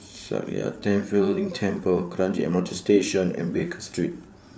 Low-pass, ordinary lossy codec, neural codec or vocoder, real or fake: none; none; codec, 16 kHz, 8 kbps, FreqCodec, larger model; fake